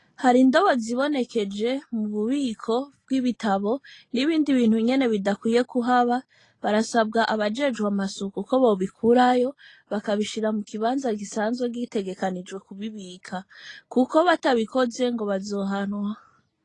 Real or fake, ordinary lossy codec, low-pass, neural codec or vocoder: real; AAC, 32 kbps; 10.8 kHz; none